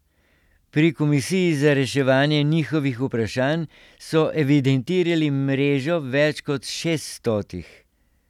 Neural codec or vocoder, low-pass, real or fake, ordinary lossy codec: none; 19.8 kHz; real; none